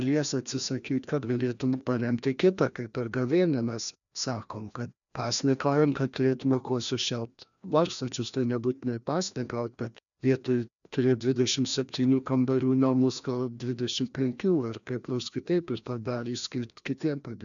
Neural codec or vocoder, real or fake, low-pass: codec, 16 kHz, 1 kbps, FreqCodec, larger model; fake; 7.2 kHz